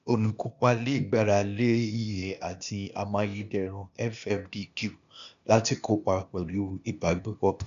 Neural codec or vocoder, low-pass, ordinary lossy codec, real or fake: codec, 16 kHz, 0.8 kbps, ZipCodec; 7.2 kHz; AAC, 96 kbps; fake